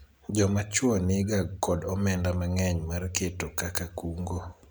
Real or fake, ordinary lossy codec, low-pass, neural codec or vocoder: real; none; none; none